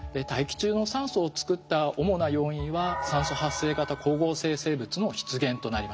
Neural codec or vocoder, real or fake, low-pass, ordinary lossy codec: none; real; none; none